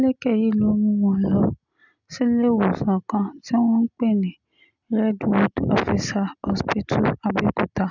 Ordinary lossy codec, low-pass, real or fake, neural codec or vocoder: none; 7.2 kHz; real; none